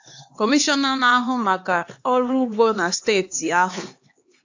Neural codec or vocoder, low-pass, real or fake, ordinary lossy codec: codec, 16 kHz, 2 kbps, X-Codec, HuBERT features, trained on LibriSpeech; 7.2 kHz; fake; AAC, 48 kbps